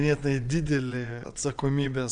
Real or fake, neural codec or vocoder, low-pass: fake; vocoder, 22.05 kHz, 80 mel bands, WaveNeXt; 9.9 kHz